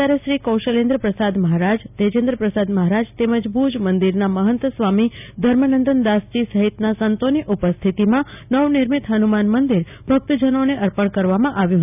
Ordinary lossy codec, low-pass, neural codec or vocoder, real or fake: none; 3.6 kHz; none; real